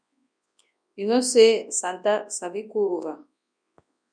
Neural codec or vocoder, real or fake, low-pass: codec, 24 kHz, 0.9 kbps, WavTokenizer, large speech release; fake; 9.9 kHz